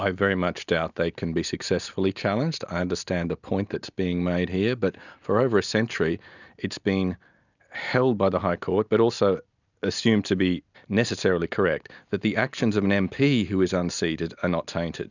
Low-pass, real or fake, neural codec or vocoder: 7.2 kHz; real; none